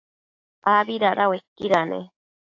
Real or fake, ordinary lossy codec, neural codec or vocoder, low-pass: fake; AAC, 48 kbps; codec, 16 kHz, 6 kbps, DAC; 7.2 kHz